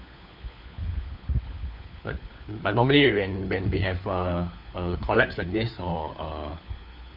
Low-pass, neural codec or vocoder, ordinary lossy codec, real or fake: 5.4 kHz; codec, 16 kHz, 4 kbps, FunCodec, trained on LibriTTS, 50 frames a second; none; fake